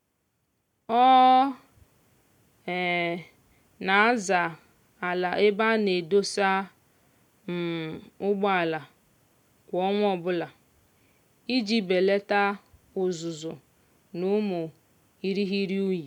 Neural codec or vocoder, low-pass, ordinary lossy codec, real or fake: none; 19.8 kHz; none; real